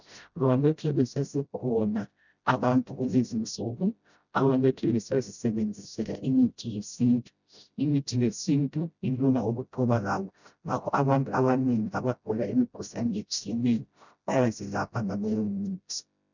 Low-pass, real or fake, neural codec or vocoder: 7.2 kHz; fake; codec, 16 kHz, 0.5 kbps, FreqCodec, smaller model